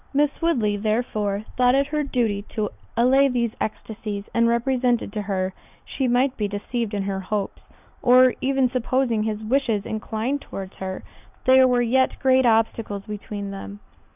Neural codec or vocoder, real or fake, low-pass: none; real; 3.6 kHz